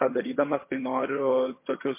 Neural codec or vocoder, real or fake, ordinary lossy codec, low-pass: vocoder, 22.05 kHz, 80 mel bands, HiFi-GAN; fake; MP3, 24 kbps; 3.6 kHz